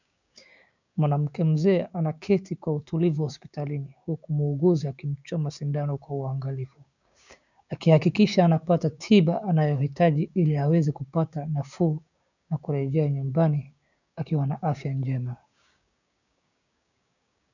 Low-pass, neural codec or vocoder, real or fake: 7.2 kHz; codec, 16 kHz, 6 kbps, DAC; fake